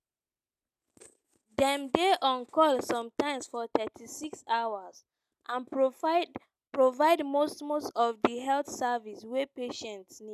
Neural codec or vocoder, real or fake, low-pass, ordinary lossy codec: none; real; 14.4 kHz; none